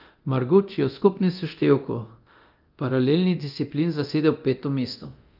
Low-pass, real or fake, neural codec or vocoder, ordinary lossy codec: 5.4 kHz; fake; codec, 24 kHz, 0.9 kbps, DualCodec; Opus, 24 kbps